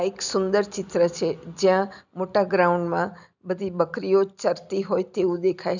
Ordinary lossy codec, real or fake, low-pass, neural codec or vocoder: none; real; 7.2 kHz; none